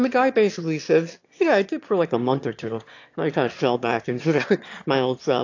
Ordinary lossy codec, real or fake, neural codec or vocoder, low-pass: AAC, 48 kbps; fake; autoencoder, 22.05 kHz, a latent of 192 numbers a frame, VITS, trained on one speaker; 7.2 kHz